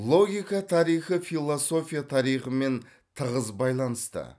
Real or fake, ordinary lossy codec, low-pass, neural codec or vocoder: real; none; none; none